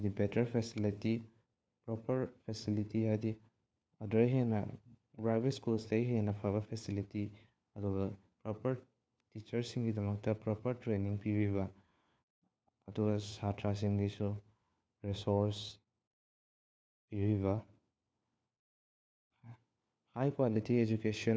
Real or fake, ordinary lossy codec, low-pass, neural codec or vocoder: fake; none; none; codec, 16 kHz, 4 kbps, FunCodec, trained on LibriTTS, 50 frames a second